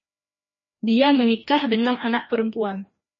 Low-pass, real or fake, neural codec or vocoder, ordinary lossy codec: 7.2 kHz; fake; codec, 16 kHz, 1 kbps, FreqCodec, larger model; MP3, 32 kbps